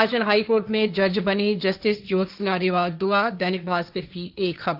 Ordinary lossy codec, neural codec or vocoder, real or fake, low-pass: none; codec, 16 kHz, 1.1 kbps, Voila-Tokenizer; fake; 5.4 kHz